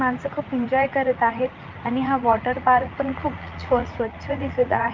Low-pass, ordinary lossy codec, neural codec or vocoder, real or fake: 7.2 kHz; Opus, 24 kbps; vocoder, 44.1 kHz, 80 mel bands, Vocos; fake